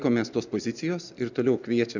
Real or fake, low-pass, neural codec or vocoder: real; 7.2 kHz; none